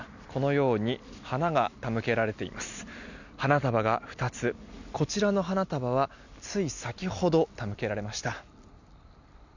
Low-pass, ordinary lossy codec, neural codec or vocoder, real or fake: 7.2 kHz; none; none; real